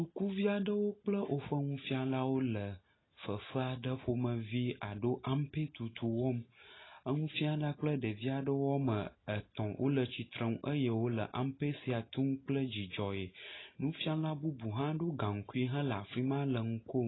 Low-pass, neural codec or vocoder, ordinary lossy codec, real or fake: 7.2 kHz; none; AAC, 16 kbps; real